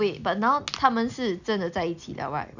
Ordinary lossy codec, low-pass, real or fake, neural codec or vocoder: none; 7.2 kHz; real; none